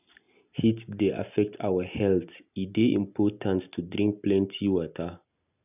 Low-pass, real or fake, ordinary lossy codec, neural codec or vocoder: 3.6 kHz; real; none; none